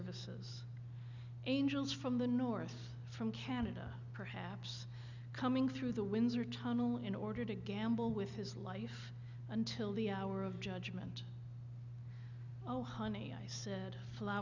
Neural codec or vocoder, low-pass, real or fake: none; 7.2 kHz; real